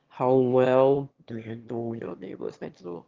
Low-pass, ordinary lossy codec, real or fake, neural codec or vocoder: 7.2 kHz; Opus, 32 kbps; fake; autoencoder, 22.05 kHz, a latent of 192 numbers a frame, VITS, trained on one speaker